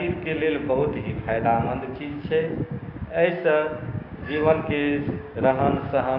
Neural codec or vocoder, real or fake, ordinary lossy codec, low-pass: codec, 16 kHz, 6 kbps, DAC; fake; Opus, 64 kbps; 5.4 kHz